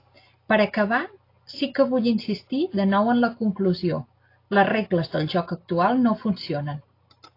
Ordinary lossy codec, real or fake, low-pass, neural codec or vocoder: AAC, 32 kbps; real; 5.4 kHz; none